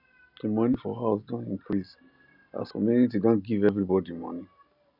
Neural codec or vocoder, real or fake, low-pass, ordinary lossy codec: none; real; 5.4 kHz; none